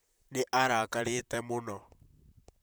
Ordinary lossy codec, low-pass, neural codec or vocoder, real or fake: none; none; vocoder, 44.1 kHz, 128 mel bands, Pupu-Vocoder; fake